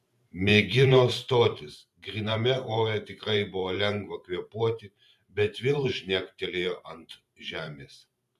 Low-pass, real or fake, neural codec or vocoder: 14.4 kHz; fake; vocoder, 44.1 kHz, 128 mel bands every 512 samples, BigVGAN v2